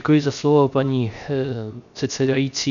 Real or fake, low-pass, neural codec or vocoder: fake; 7.2 kHz; codec, 16 kHz, 0.3 kbps, FocalCodec